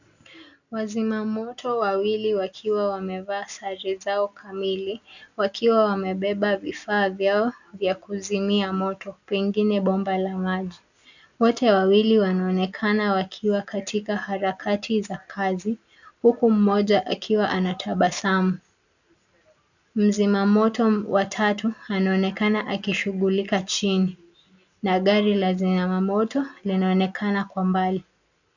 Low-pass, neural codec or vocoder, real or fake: 7.2 kHz; none; real